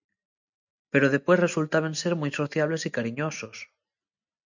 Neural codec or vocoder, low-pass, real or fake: none; 7.2 kHz; real